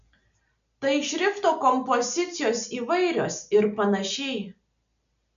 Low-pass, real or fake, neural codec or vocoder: 7.2 kHz; real; none